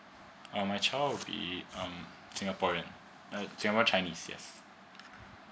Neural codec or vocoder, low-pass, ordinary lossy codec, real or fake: none; none; none; real